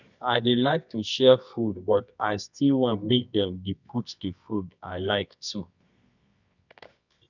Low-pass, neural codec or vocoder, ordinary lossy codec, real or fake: 7.2 kHz; codec, 24 kHz, 0.9 kbps, WavTokenizer, medium music audio release; none; fake